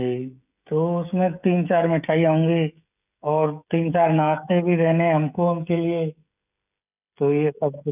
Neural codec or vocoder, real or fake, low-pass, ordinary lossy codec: codec, 16 kHz, 16 kbps, FreqCodec, smaller model; fake; 3.6 kHz; none